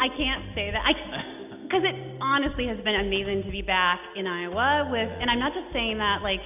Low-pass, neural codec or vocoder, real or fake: 3.6 kHz; none; real